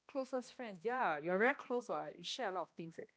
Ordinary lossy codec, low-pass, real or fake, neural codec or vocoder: none; none; fake; codec, 16 kHz, 1 kbps, X-Codec, HuBERT features, trained on balanced general audio